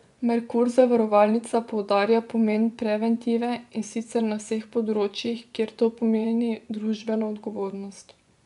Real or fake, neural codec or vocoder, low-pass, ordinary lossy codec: fake; vocoder, 24 kHz, 100 mel bands, Vocos; 10.8 kHz; none